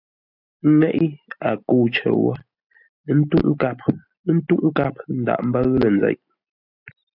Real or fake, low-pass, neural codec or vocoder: real; 5.4 kHz; none